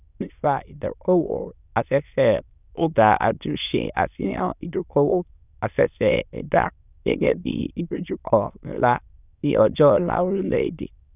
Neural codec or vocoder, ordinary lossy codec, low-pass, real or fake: autoencoder, 22.05 kHz, a latent of 192 numbers a frame, VITS, trained on many speakers; none; 3.6 kHz; fake